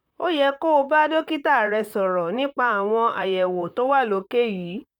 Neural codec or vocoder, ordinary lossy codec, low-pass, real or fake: vocoder, 44.1 kHz, 128 mel bands, Pupu-Vocoder; none; 19.8 kHz; fake